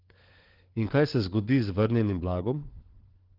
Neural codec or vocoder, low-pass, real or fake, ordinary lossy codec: codec, 16 kHz, 4 kbps, FunCodec, trained on LibriTTS, 50 frames a second; 5.4 kHz; fake; Opus, 16 kbps